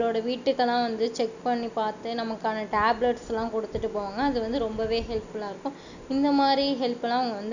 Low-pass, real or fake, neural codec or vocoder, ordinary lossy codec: 7.2 kHz; real; none; none